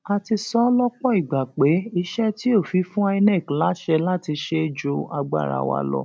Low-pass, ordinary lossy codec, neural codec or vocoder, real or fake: none; none; none; real